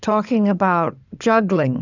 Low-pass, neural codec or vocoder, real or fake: 7.2 kHz; codec, 16 kHz in and 24 kHz out, 2.2 kbps, FireRedTTS-2 codec; fake